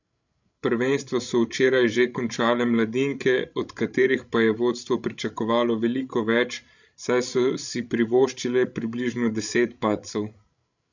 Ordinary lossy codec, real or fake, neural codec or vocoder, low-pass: none; fake; codec, 16 kHz, 16 kbps, FreqCodec, larger model; 7.2 kHz